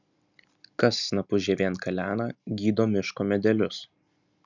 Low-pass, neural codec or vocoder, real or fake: 7.2 kHz; none; real